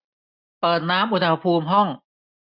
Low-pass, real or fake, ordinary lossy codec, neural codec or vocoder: 5.4 kHz; real; none; none